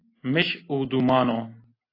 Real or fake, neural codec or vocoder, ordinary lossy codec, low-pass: real; none; AAC, 24 kbps; 5.4 kHz